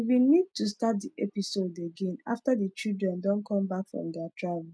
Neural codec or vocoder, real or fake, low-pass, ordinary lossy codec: none; real; none; none